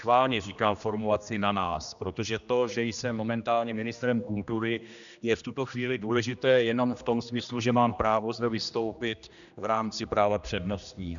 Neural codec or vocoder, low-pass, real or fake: codec, 16 kHz, 1 kbps, X-Codec, HuBERT features, trained on general audio; 7.2 kHz; fake